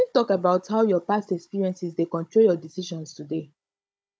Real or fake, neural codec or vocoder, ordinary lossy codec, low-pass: fake; codec, 16 kHz, 16 kbps, FunCodec, trained on Chinese and English, 50 frames a second; none; none